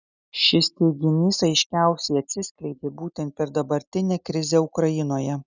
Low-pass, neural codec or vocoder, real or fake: 7.2 kHz; none; real